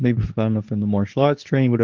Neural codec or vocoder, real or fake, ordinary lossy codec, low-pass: codec, 24 kHz, 0.9 kbps, WavTokenizer, medium speech release version 2; fake; Opus, 32 kbps; 7.2 kHz